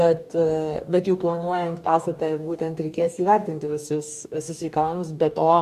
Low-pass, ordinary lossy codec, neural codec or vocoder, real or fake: 14.4 kHz; MP3, 96 kbps; codec, 44.1 kHz, 2.6 kbps, DAC; fake